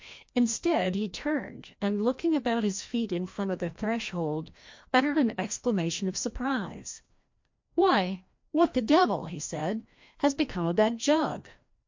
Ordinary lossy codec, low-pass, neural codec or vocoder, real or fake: MP3, 48 kbps; 7.2 kHz; codec, 16 kHz, 1 kbps, FreqCodec, larger model; fake